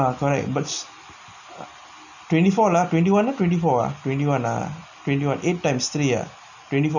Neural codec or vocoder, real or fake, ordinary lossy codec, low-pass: none; real; none; 7.2 kHz